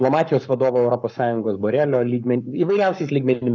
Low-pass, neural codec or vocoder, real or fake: 7.2 kHz; codec, 44.1 kHz, 7.8 kbps, Pupu-Codec; fake